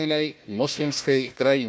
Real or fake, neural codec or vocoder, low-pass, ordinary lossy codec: fake; codec, 16 kHz, 1 kbps, FunCodec, trained on Chinese and English, 50 frames a second; none; none